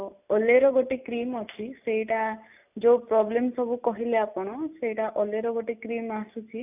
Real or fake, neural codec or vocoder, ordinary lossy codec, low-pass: real; none; none; 3.6 kHz